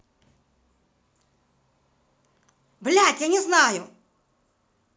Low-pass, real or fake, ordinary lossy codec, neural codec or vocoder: none; real; none; none